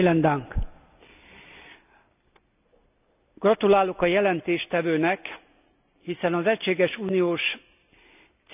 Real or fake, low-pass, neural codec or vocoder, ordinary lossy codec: real; 3.6 kHz; none; none